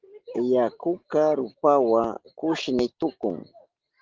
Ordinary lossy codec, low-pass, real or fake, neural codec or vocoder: Opus, 16 kbps; 7.2 kHz; real; none